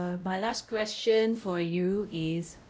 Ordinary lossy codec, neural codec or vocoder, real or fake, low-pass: none; codec, 16 kHz, 0.5 kbps, X-Codec, WavLM features, trained on Multilingual LibriSpeech; fake; none